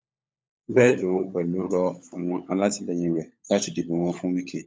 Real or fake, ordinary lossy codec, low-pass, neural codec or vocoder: fake; none; none; codec, 16 kHz, 16 kbps, FunCodec, trained on LibriTTS, 50 frames a second